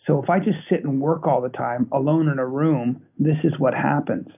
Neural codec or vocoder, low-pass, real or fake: none; 3.6 kHz; real